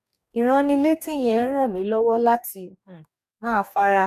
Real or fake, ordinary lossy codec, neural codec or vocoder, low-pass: fake; none; codec, 44.1 kHz, 2.6 kbps, DAC; 14.4 kHz